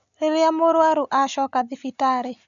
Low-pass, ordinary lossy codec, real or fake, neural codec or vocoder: 7.2 kHz; none; real; none